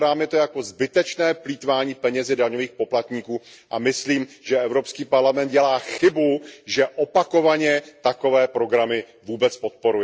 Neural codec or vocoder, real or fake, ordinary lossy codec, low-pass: none; real; none; none